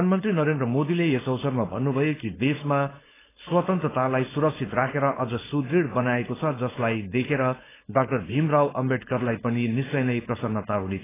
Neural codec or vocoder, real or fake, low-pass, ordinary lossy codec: codec, 16 kHz, 4.8 kbps, FACodec; fake; 3.6 kHz; AAC, 16 kbps